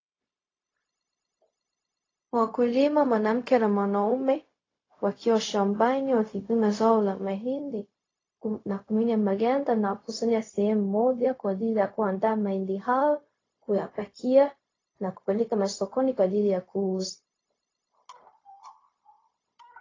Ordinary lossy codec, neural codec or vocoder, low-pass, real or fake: AAC, 32 kbps; codec, 16 kHz, 0.4 kbps, LongCat-Audio-Codec; 7.2 kHz; fake